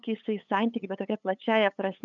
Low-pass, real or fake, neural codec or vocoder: 7.2 kHz; fake; codec, 16 kHz, 8 kbps, FunCodec, trained on LibriTTS, 25 frames a second